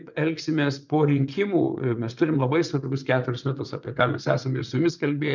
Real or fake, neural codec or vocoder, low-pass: fake; vocoder, 22.05 kHz, 80 mel bands, WaveNeXt; 7.2 kHz